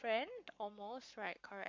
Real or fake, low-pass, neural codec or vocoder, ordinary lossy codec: fake; 7.2 kHz; codec, 44.1 kHz, 7.8 kbps, Pupu-Codec; MP3, 48 kbps